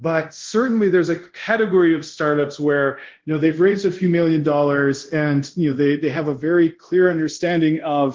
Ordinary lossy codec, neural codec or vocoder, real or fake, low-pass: Opus, 16 kbps; codec, 24 kHz, 0.5 kbps, DualCodec; fake; 7.2 kHz